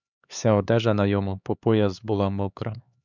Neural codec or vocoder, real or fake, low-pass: codec, 16 kHz, 4 kbps, X-Codec, HuBERT features, trained on LibriSpeech; fake; 7.2 kHz